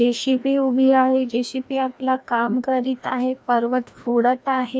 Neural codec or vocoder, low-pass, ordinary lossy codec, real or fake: codec, 16 kHz, 1 kbps, FreqCodec, larger model; none; none; fake